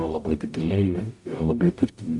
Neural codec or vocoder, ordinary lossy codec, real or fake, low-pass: codec, 44.1 kHz, 0.9 kbps, DAC; AAC, 64 kbps; fake; 10.8 kHz